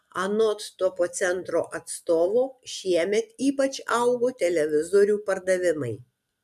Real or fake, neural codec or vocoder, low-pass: real; none; 14.4 kHz